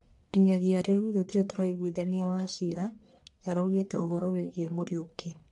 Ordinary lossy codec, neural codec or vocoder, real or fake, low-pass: AAC, 64 kbps; codec, 44.1 kHz, 1.7 kbps, Pupu-Codec; fake; 10.8 kHz